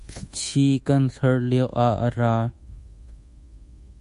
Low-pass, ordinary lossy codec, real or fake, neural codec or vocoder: 10.8 kHz; MP3, 48 kbps; fake; codec, 24 kHz, 1.2 kbps, DualCodec